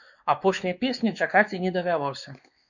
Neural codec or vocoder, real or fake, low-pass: codec, 16 kHz, 2 kbps, X-Codec, WavLM features, trained on Multilingual LibriSpeech; fake; 7.2 kHz